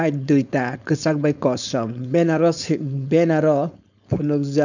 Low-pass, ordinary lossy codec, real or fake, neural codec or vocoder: 7.2 kHz; none; fake; codec, 16 kHz, 4.8 kbps, FACodec